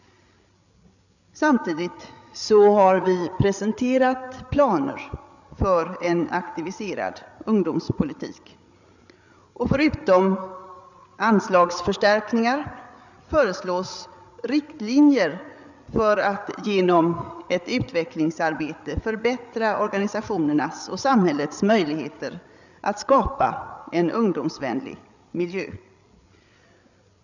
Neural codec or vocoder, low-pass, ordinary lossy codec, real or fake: codec, 16 kHz, 8 kbps, FreqCodec, larger model; 7.2 kHz; none; fake